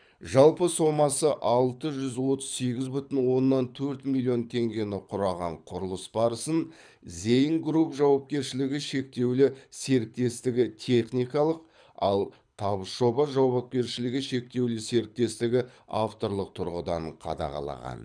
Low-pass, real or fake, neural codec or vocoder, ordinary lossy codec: 9.9 kHz; fake; codec, 24 kHz, 6 kbps, HILCodec; none